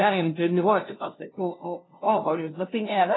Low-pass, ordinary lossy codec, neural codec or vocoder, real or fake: 7.2 kHz; AAC, 16 kbps; codec, 16 kHz, 0.5 kbps, FunCodec, trained on LibriTTS, 25 frames a second; fake